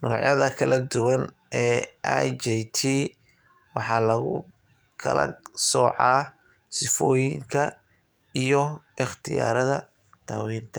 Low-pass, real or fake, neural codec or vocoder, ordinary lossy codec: none; fake; codec, 44.1 kHz, 7.8 kbps, DAC; none